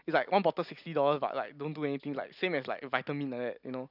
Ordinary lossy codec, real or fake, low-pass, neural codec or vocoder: none; real; 5.4 kHz; none